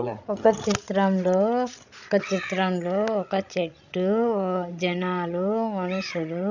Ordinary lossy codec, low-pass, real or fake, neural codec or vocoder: none; 7.2 kHz; fake; codec, 16 kHz, 16 kbps, FreqCodec, larger model